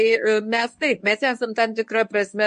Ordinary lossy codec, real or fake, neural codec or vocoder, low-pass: MP3, 48 kbps; fake; codec, 24 kHz, 0.9 kbps, WavTokenizer, medium speech release version 1; 10.8 kHz